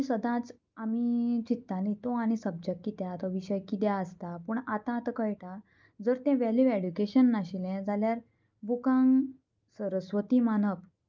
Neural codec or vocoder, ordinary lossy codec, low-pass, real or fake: none; Opus, 24 kbps; 7.2 kHz; real